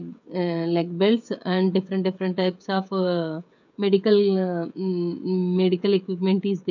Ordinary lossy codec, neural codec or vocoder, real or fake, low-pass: none; codec, 16 kHz, 16 kbps, FreqCodec, smaller model; fake; 7.2 kHz